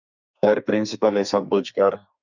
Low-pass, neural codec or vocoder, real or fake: 7.2 kHz; codec, 32 kHz, 1.9 kbps, SNAC; fake